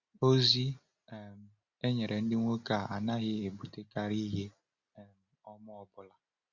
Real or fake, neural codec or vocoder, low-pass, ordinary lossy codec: real; none; 7.2 kHz; Opus, 64 kbps